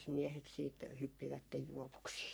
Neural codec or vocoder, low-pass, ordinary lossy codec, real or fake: codec, 44.1 kHz, 3.4 kbps, Pupu-Codec; none; none; fake